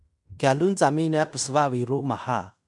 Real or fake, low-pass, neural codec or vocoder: fake; 10.8 kHz; codec, 16 kHz in and 24 kHz out, 0.9 kbps, LongCat-Audio-Codec, fine tuned four codebook decoder